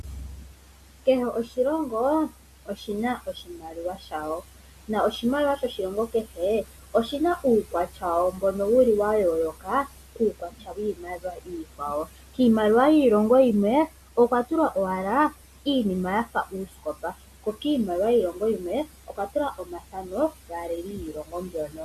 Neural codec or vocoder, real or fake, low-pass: none; real; 14.4 kHz